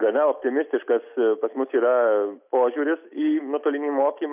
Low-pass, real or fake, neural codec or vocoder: 3.6 kHz; real; none